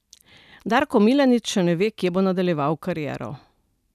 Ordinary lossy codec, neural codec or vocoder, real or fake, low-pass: none; none; real; 14.4 kHz